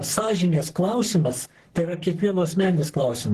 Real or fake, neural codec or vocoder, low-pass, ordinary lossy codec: fake; codec, 44.1 kHz, 3.4 kbps, Pupu-Codec; 14.4 kHz; Opus, 16 kbps